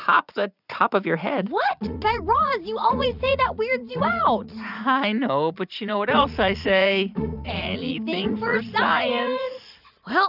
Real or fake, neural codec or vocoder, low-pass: fake; vocoder, 44.1 kHz, 80 mel bands, Vocos; 5.4 kHz